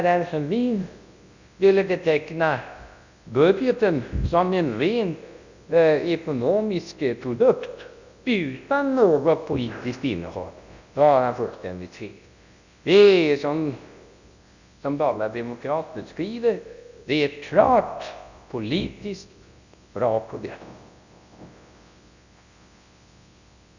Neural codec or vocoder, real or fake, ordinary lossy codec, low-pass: codec, 24 kHz, 0.9 kbps, WavTokenizer, large speech release; fake; Opus, 64 kbps; 7.2 kHz